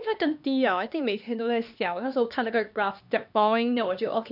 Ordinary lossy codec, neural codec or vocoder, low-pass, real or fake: none; codec, 16 kHz, 2 kbps, X-Codec, HuBERT features, trained on LibriSpeech; 5.4 kHz; fake